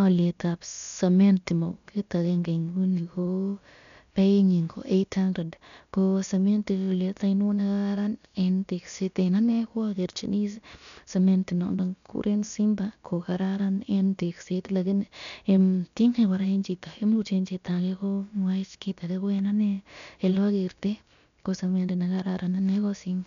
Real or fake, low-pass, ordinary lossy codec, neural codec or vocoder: fake; 7.2 kHz; none; codec, 16 kHz, about 1 kbps, DyCAST, with the encoder's durations